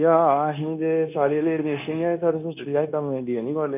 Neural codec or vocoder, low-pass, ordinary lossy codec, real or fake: codec, 16 kHz, 0.9 kbps, LongCat-Audio-Codec; 3.6 kHz; none; fake